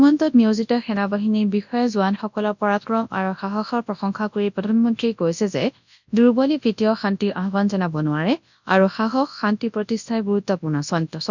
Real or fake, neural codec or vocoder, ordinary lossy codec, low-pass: fake; codec, 24 kHz, 0.9 kbps, WavTokenizer, large speech release; none; 7.2 kHz